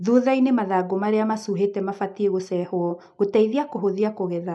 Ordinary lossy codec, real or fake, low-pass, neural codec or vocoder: none; real; none; none